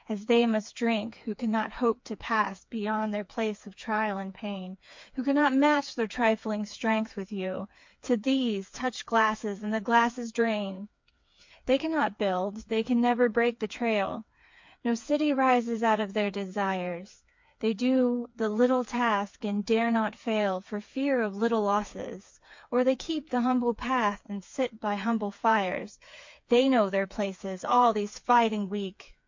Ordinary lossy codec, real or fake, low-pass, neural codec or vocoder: MP3, 48 kbps; fake; 7.2 kHz; codec, 16 kHz, 4 kbps, FreqCodec, smaller model